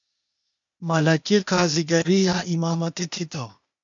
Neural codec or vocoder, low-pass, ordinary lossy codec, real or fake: codec, 16 kHz, 0.8 kbps, ZipCodec; 7.2 kHz; MP3, 64 kbps; fake